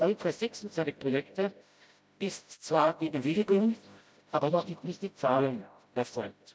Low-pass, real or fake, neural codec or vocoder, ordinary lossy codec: none; fake; codec, 16 kHz, 0.5 kbps, FreqCodec, smaller model; none